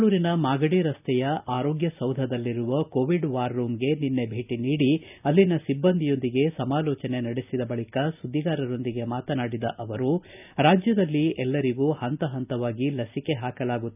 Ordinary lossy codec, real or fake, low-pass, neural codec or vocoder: none; real; 3.6 kHz; none